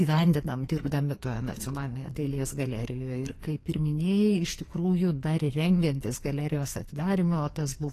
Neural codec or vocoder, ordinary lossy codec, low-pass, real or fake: codec, 44.1 kHz, 2.6 kbps, SNAC; AAC, 48 kbps; 14.4 kHz; fake